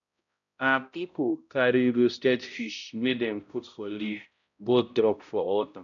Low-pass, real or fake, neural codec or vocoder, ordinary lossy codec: 7.2 kHz; fake; codec, 16 kHz, 0.5 kbps, X-Codec, HuBERT features, trained on balanced general audio; none